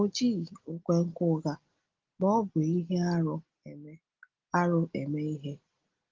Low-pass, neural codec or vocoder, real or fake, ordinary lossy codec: 7.2 kHz; none; real; Opus, 16 kbps